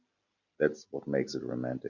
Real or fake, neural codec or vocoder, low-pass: real; none; 7.2 kHz